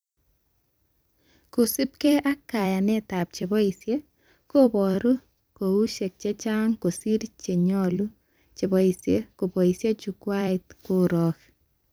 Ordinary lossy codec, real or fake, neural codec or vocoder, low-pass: none; fake; vocoder, 44.1 kHz, 128 mel bands every 256 samples, BigVGAN v2; none